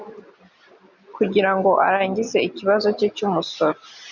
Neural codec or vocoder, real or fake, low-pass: none; real; 7.2 kHz